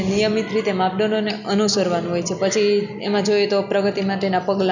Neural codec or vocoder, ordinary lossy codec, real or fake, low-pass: none; none; real; 7.2 kHz